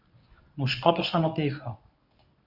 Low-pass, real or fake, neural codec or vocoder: 5.4 kHz; fake; codec, 24 kHz, 0.9 kbps, WavTokenizer, medium speech release version 1